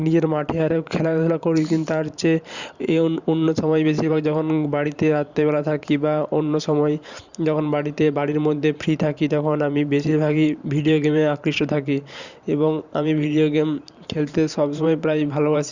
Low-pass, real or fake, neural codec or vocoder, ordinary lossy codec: 7.2 kHz; fake; vocoder, 44.1 kHz, 128 mel bands every 512 samples, BigVGAN v2; Opus, 64 kbps